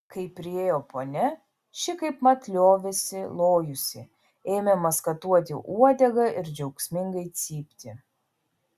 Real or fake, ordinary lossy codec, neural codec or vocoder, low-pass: real; Opus, 64 kbps; none; 14.4 kHz